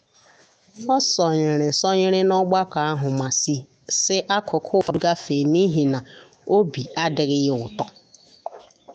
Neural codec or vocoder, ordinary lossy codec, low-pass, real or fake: autoencoder, 48 kHz, 128 numbers a frame, DAC-VAE, trained on Japanese speech; none; 9.9 kHz; fake